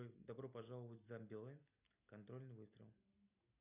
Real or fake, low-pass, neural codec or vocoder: real; 3.6 kHz; none